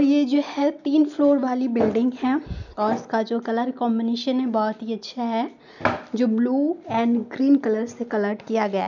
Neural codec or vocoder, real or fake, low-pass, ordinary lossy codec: none; real; 7.2 kHz; none